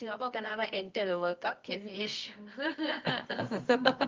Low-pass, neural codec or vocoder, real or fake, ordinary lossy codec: 7.2 kHz; codec, 24 kHz, 0.9 kbps, WavTokenizer, medium music audio release; fake; Opus, 32 kbps